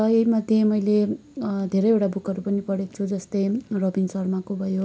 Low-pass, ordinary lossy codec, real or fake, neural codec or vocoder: none; none; real; none